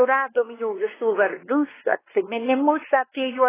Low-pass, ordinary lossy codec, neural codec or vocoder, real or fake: 3.6 kHz; AAC, 16 kbps; codec, 16 kHz, 2 kbps, X-Codec, WavLM features, trained on Multilingual LibriSpeech; fake